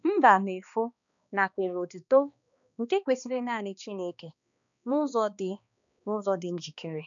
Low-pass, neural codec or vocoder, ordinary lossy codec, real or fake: 7.2 kHz; codec, 16 kHz, 2 kbps, X-Codec, HuBERT features, trained on balanced general audio; none; fake